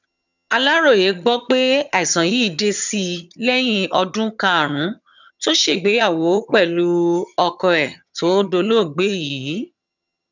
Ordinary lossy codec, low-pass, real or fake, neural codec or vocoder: none; 7.2 kHz; fake; vocoder, 22.05 kHz, 80 mel bands, HiFi-GAN